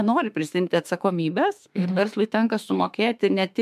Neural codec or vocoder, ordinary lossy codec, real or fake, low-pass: autoencoder, 48 kHz, 32 numbers a frame, DAC-VAE, trained on Japanese speech; MP3, 96 kbps; fake; 14.4 kHz